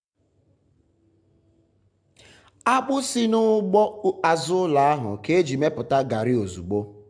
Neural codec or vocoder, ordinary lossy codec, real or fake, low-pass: none; AAC, 64 kbps; real; 9.9 kHz